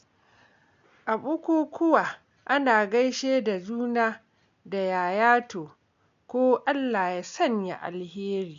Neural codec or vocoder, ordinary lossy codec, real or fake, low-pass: none; MP3, 64 kbps; real; 7.2 kHz